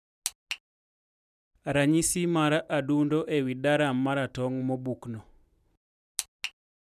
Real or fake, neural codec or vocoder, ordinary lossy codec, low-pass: real; none; none; 14.4 kHz